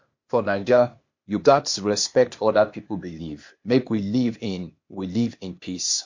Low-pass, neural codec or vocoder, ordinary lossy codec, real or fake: 7.2 kHz; codec, 16 kHz, 0.8 kbps, ZipCodec; MP3, 48 kbps; fake